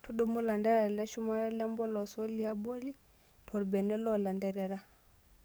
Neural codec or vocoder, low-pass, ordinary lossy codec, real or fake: codec, 44.1 kHz, 7.8 kbps, DAC; none; none; fake